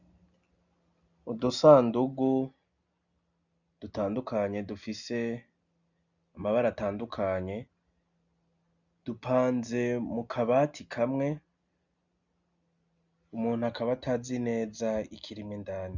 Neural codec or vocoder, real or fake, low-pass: none; real; 7.2 kHz